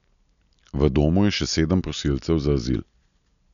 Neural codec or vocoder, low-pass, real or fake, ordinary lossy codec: none; 7.2 kHz; real; none